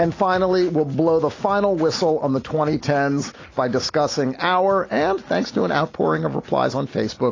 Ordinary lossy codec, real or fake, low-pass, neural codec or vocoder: AAC, 32 kbps; real; 7.2 kHz; none